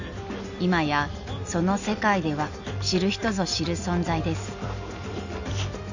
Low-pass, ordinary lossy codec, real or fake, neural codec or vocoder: 7.2 kHz; none; real; none